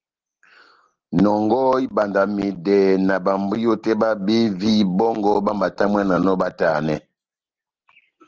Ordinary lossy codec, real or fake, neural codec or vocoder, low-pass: Opus, 16 kbps; real; none; 7.2 kHz